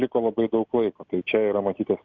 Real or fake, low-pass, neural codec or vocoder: real; 7.2 kHz; none